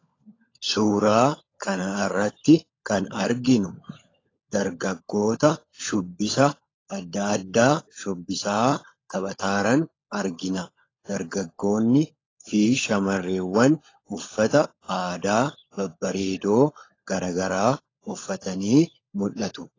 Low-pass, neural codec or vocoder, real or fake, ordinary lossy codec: 7.2 kHz; codec, 16 kHz, 16 kbps, FunCodec, trained on LibriTTS, 50 frames a second; fake; AAC, 32 kbps